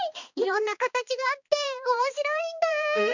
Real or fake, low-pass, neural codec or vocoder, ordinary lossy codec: fake; 7.2 kHz; codec, 16 kHz, 2 kbps, X-Codec, HuBERT features, trained on balanced general audio; none